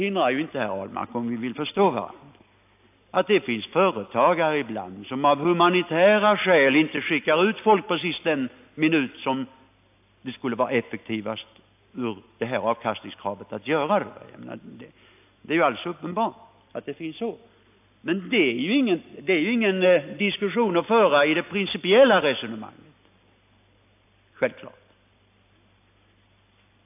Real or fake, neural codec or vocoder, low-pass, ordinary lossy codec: real; none; 3.6 kHz; AAC, 32 kbps